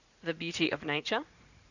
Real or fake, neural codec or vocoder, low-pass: fake; vocoder, 44.1 kHz, 80 mel bands, Vocos; 7.2 kHz